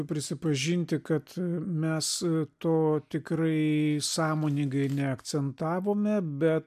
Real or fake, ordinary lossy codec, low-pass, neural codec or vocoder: real; MP3, 96 kbps; 14.4 kHz; none